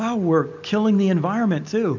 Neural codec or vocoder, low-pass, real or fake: none; 7.2 kHz; real